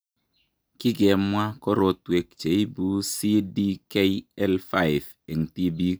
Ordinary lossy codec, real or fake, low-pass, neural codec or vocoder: none; real; none; none